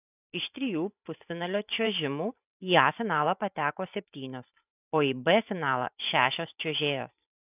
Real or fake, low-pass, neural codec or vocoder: fake; 3.6 kHz; vocoder, 44.1 kHz, 128 mel bands every 512 samples, BigVGAN v2